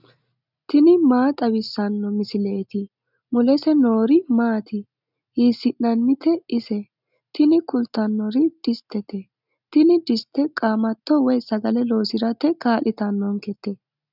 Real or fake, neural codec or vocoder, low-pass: real; none; 5.4 kHz